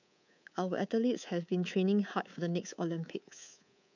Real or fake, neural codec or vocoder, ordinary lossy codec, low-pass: fake; codec, 24 kHz, 3.1 kbps, DualCodec; none; 7.2 kHz